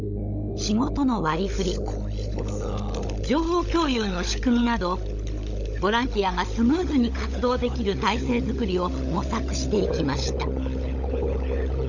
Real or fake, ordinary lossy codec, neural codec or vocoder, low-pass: fake; none; codec, 16 kHz, 16 kbps, FunCodec, trained on LibriTTS, 50 frames a second; 7.2 kHz